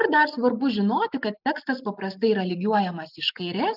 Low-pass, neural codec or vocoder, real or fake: 5.4 kHz; none; real